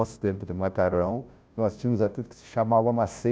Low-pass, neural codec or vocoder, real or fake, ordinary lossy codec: none; codec, 16 kHz, 0.5 kbps, FunCodec, trained on Chinese and English, 25 frames a second; fake; none